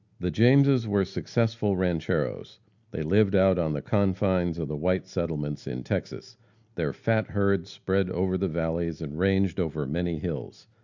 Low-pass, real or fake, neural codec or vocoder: 7.2 kHz; real; none